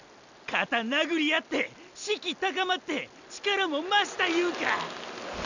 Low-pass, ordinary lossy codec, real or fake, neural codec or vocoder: 7.2 kHz; none; real; none